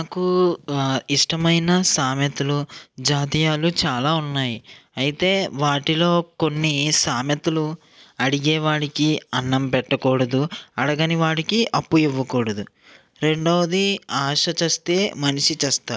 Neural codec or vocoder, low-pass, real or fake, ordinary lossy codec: none; none; real; none